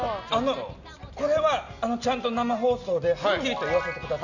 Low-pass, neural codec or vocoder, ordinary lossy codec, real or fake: 7.2 kHz; none; none; real